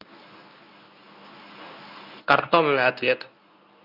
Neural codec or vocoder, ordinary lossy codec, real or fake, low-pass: codec, 24 kHz, 0.9 kbps, WavTokenizer, medium speech release version 1; none; fake; 5.4 kHz